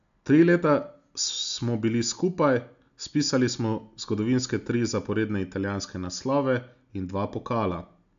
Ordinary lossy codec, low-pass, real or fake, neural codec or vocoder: AAC, 96 kbps; 7.2 kHz; real; none